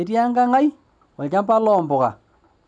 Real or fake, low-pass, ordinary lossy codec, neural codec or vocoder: real; none; none; none